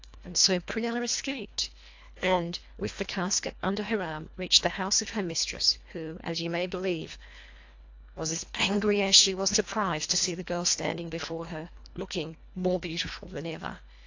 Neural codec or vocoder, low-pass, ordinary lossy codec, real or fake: codec, 24 kHz, 1.5 kbps, HILCodec; 7.2 kHz; AAC, 48 kbps; fake